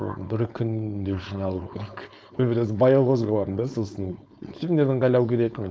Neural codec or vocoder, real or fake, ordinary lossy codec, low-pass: codec, 16 kHz, 4.8 kbps, FACodec; fake; none; none